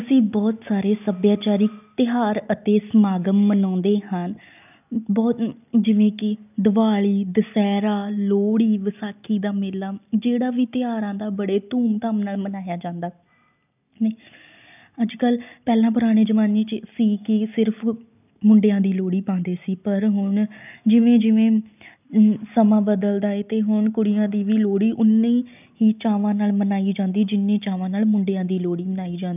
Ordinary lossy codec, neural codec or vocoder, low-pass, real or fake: none; none; 3.6 kHz; real